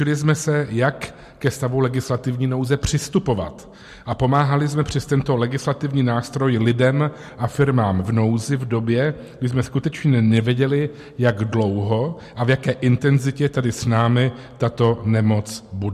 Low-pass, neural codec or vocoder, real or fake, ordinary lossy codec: 14.4 kHz; none; real; MP3, 64 kbps